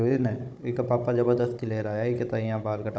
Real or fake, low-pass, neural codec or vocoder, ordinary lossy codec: fake; none; codec, 16 kHz, 16 kbps, FunCodec, trained on Chinese and English, 50 frames a second; none